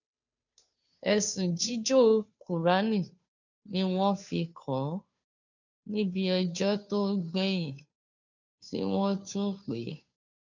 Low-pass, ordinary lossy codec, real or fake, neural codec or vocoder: 7.2 kHz; none; fake; codec, 16 kHz, 2 kbps, FunCodec, trained on Chinese and English, 25 frames a second